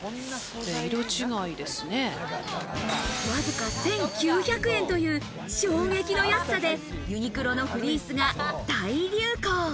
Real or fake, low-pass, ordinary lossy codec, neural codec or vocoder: real; none; none; none